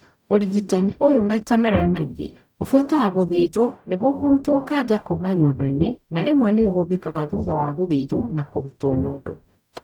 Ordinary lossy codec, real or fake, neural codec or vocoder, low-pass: none; fake; codec, 44.1 kHz, 0.9 kbps, DAC; 19.8 kHz